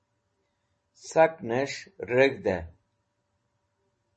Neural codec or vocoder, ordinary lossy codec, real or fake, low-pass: none; MP3, 32 kbps; real; 10.8 kHz